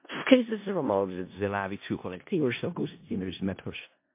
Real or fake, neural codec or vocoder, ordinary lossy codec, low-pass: fake; codec, 16 kHz in and 24 kHz out, 0.4 kbps, LongCat-Audio-Codec, four codebook decoder; MP3, 24 kbps; 3.6 kHz